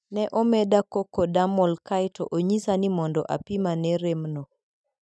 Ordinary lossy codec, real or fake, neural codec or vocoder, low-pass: none; real; none; none